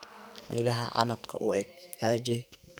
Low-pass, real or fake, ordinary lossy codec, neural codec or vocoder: none; fake; none; codec, 44.1 kHz, 2.6 kbps, SNAC